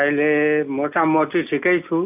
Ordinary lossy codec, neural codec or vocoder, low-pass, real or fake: none; none; 3.6 kHz; real